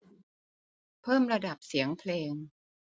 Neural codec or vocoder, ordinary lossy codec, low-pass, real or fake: none; none; none; real